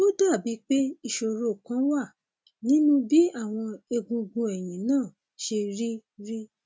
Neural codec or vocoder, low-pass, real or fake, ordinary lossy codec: none; none; real; none